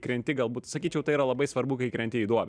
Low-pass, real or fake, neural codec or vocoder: 9.9 kHz; real; none